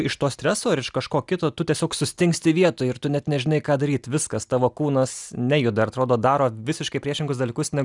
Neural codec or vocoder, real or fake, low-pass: none; real; 10.8 kHz